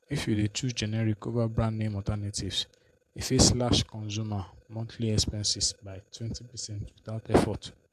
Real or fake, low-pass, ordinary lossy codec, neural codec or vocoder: fake; 14.4 kHz; AAC, 96 kbps; vocoder, 48 kHz, 128 mel bands, Vocos